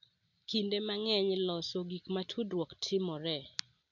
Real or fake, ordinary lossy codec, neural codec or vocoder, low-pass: real; none; none; 7.2 kHz